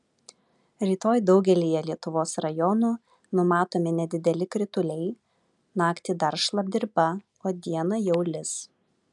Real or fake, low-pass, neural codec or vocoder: real; 10.8 kHz; none